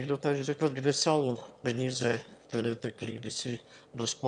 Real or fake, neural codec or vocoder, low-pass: fake; autoencoder, 22.05 kHz, a latent of 192 numbers a frame, VITS, trained on one speaker; 9.9 kHz